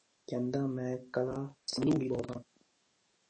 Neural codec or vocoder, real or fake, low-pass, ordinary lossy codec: codec, 44.1 kHz, 7.8 kbps, DAC; fake; 9.9 kHz; MP3, 32 kbps